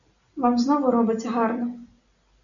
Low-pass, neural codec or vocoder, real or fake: 7.2 kHz; none; real